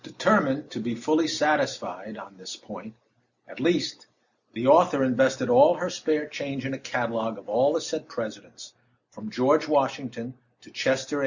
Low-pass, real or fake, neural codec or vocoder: 7.2 kHz; real; none